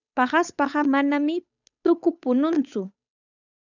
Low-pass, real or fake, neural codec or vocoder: 7.2 kHz; fake; codec, 16 kHz, 8 kbps, FunCodec, trained on Chinese and English, 25 frames a second